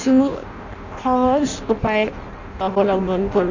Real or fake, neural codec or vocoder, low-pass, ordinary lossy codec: fake; codec, 16 kHz in and 24 kHz out, 0.6 kbps, FireRedTTS-2 codec; 7.2 kHz; none